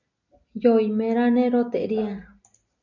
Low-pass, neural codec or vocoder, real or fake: 7.2 kHz; none; real